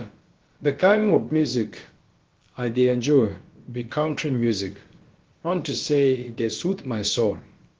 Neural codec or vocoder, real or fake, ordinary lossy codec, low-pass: codec, 16 kHz, about 1 kbps, DyCAST, with the encoder's durations; fake; Opus, 16 kbps; 7.2 kHz